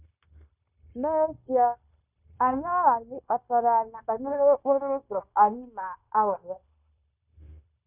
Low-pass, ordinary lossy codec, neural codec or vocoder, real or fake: 3.6 kHz; none; codec, 16 kHz, 0.9 kbps, LongCat-Audio-Codec; fake